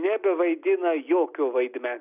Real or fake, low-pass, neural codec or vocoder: real; 3.6 kHz; none